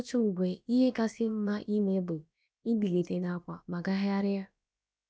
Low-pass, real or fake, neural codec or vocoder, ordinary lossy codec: none; fake; codec, 16 kHz, about 1 kbps, DyCAST, with the encoder's durations; none